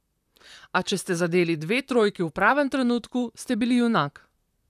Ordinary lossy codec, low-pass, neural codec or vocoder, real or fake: none; 14.4 kHz; vocoder, 44.1 kHz, 128 mel bands, Pupu-Vocoder; fake